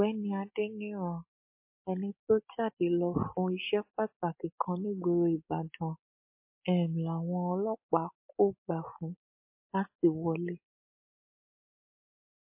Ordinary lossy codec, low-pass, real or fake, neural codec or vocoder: MP3, 24 kbps; 3.6 kHz; real; none